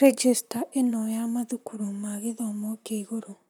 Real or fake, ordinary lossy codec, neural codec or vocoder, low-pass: fake; none; vocoder, 44.1 kHz, 128 mel bands, Pupu-Vocoder; none